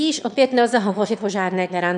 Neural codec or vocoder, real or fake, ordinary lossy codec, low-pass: autoencoder, 22.05 kHz, a latent of 192 numbers a frame, VITS, trained on one speaker; fake; MP3, 96 kbps; 9.9 kHz